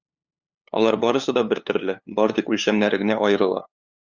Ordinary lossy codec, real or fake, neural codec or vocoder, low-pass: Opus, 64 kbps; fake; codec, 16 kHz, 2 kbps, FunCodec, trained on LibriTTS, 25 frames a second; 7.2 kHz